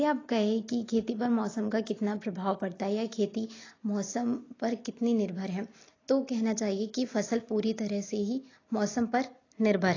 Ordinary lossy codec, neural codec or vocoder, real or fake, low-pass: AAC, 32 kbps; none; real; 7.2 kHz